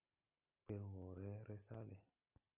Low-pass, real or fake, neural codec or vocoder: 3.6 kHz; real; none